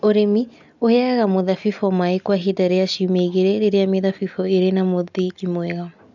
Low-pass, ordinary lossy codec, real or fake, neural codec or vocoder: 7.2 kHz; none; real; none